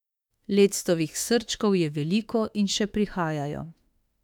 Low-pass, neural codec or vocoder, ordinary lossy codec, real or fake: 19.8 kHz; autoencoder, 48 kHz, 32 numbers a frame, DAC-VAE, trained on Japanese speech; none; fake